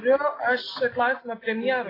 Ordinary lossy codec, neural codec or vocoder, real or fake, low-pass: AAC, 24 kbps; none; real; 5.4 kHz